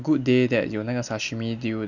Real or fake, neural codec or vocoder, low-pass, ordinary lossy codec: real; none; 7.2 kHz; Opus, 64 kbps